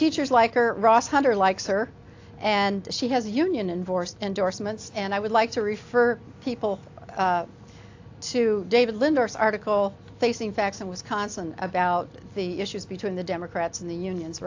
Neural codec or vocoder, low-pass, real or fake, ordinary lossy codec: none; 7.2 kHz; real; AAC, 48 kbps